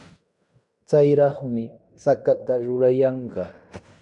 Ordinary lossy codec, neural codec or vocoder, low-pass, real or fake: MP3, 96 kbps; codec, 16 kHz in and 24 kHz out, 0.9 kbps, LongCat-Audio-Codec, fine tuned four codebook decoder; 10.8 kHz; fake